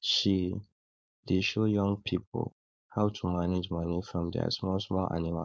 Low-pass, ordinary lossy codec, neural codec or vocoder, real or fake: none; none; codec, 16 kHz, 4.8 kbps, FACodec; fake